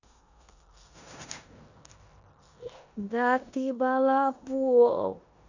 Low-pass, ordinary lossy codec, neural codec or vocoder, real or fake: 7.2 kHz; none; codec, 16 kHz in and 24 kHz out, 0.9 kbps, LongCat-Audio-Codec, four codebook decoder; fake